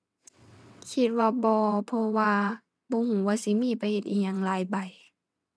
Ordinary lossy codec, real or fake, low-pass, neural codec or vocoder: none; fake; none; vocoder, 22.05 kHz, 80 mel bands, WaveNeXt